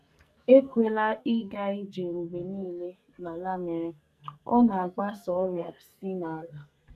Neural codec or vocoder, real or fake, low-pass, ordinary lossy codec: codec, 44.1 kHz, 2.6 kbps, SNAC; fake; 14.4 kHz; none